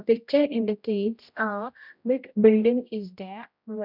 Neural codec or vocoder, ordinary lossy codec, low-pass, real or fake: codec, 16 kHz, 0.5 kbps, X-Codec, HuBERT features, trained on general audio; none; 5.4 kHz; fake